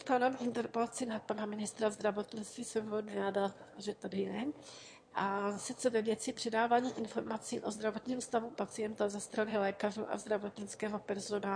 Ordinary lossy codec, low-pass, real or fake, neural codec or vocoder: MP3, 48 kbps; 9.9 kHz; fake; autoencoder, 22.05 kHz, a latent of 192 numbers a frame, VITS, trained on one speaker